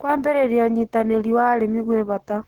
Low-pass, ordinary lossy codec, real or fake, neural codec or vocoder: 19.8 kHz; Opus, 16 kbps; fake; codec, 44.1 kHz, 7.8 kbps, Pupu-Codec